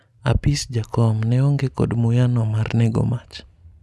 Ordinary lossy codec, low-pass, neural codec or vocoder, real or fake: none; none; none; real